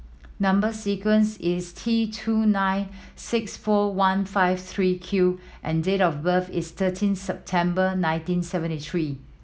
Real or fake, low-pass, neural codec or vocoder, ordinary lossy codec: real; none; none; none